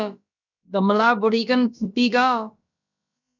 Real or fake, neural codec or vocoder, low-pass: fake; codec, 16 kHz, about 1 kbps, DyCAST, with the encoder's durations; 7.2 kHz